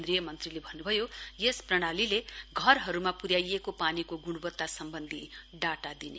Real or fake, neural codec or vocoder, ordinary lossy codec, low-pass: real; none; none; none